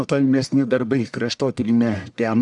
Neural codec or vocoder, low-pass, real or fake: codec, 44.1 kHz, 1.7 kbps, Pupu-Codec; 10.8 kHz; fake